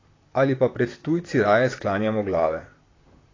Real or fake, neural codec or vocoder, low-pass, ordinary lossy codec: fake; vocoder, 44.1 kHz, 128 mel bands, Pupu-Vocoder; 7.2 kHz; AAC, 32 kbps